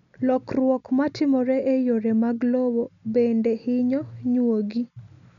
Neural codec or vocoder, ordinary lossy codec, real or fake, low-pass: none; none; real; 7.2 kHz